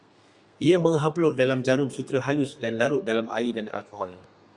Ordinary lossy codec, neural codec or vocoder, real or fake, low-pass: Opus, 64 kbps; codec, 32 kHz, 1.9 kbps, SNAC; fake; 10.8 kHz